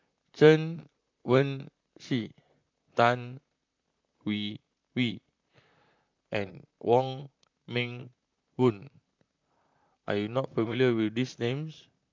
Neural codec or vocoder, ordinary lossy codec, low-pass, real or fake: vocoder, 44.1 kHz, 128 mel bands, Pupu-Vocoder; MP3, 64 kbps; 7.2 kHz; fake